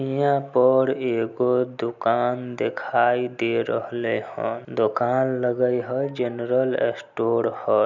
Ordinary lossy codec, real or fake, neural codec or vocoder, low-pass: none; real; none; 7.2 kHz